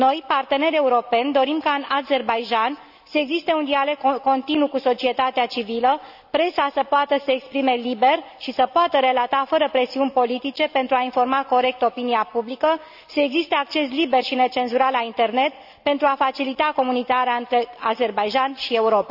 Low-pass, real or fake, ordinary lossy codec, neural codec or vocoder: 5.4 kHz; real; none; none